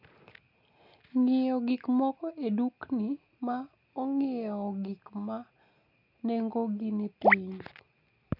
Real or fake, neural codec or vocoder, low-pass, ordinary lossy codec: real; none; 5.4 kHz; MP3, 48 kbps